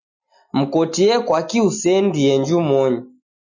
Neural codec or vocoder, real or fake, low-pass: none; real; 7.2 kHz